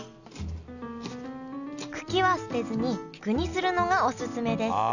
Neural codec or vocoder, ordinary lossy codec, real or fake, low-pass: none; none; real; 7.2 kHz